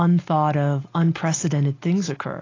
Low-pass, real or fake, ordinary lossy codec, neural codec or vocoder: 7.2 kHz; real; AAC, 32 kbps; none